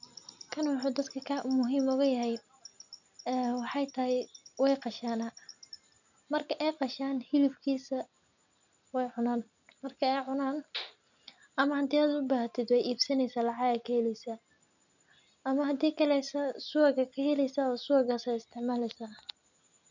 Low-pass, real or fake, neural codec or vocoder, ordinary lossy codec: 7.2 kHz; real; none; none